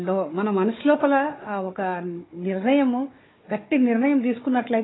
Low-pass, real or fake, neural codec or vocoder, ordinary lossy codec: 7.2 kHz; fake; codec, 16 kHz, 4 kbps, FunCodec, trained on Chinese and English, 50 frames a second; AAC, 16 kbps